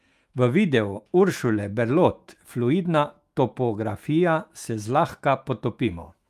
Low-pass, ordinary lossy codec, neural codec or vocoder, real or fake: 14.4 kHz; Opus, 32 kbps; autoencoder, 48 kHz, 128 numbers a frame, DAC-VAE, trained on Japanese speech; fake